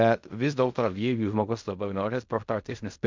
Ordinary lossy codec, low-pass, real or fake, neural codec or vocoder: MP3, 64 kbps; 7.2 kHz; fake; codec, 16 kHz in and 24 kHz out, 0.4 kbps, LongCat-Audio-Codec, fine tuned four codebook decoder